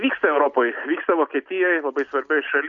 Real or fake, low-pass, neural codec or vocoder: real; 7.2 kHz; none